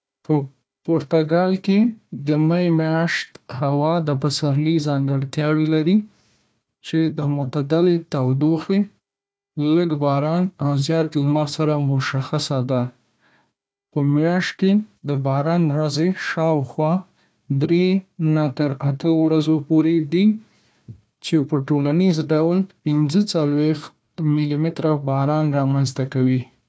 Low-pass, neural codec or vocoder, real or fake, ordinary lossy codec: none; codec, 16 kHz, 1 kbps, FunCodec, trained on Chinese and English, 50 frames a second; fake; none